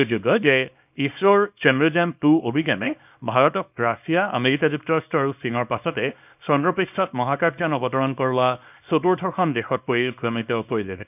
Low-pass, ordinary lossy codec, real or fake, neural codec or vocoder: 3.6 kHz; none; fake; codec, 24 kHz, 0.9 kbps, WavTokenizer, small release